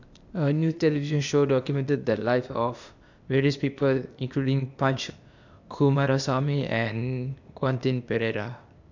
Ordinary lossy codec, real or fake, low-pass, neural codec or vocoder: none; fake; 7.2 kHz; codec, 16 kHz, 0.8 kbps, ZipCodec